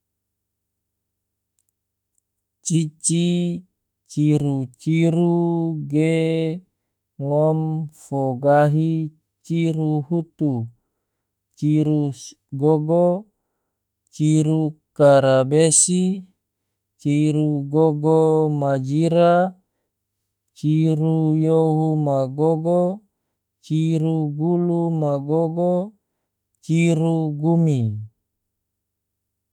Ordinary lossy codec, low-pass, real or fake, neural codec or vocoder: none; 19.8 kHz; fake; autoencoder, 48 kHz, 32 numbers a frame, DAC-VAE, trained on Japanese speech